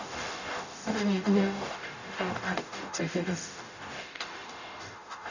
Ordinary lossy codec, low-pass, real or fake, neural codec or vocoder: none; 7.2 kHz; fake; codec, 44.1 kHz, 0.9 kbps, DAC